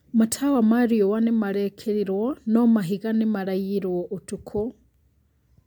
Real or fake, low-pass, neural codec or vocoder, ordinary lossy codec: real; 19.8 kHz; none; MP3, 96 kbps